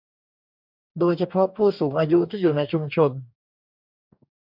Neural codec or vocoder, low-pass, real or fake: codec, 44.1 kHz, 2.6 kbps, DAC; 5.4 kHz; fake